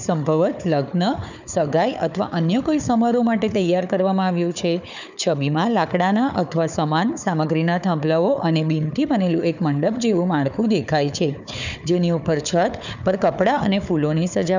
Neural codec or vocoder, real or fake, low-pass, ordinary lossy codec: codec, 16 kHz, 4 kbps, FunCodec, trained on Chinese and English, 50 frames a second; fake; 7.2 kHz; none